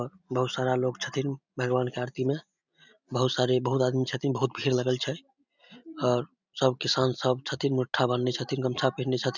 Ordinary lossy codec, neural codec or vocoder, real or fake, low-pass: none; none; real; 7.2 kHz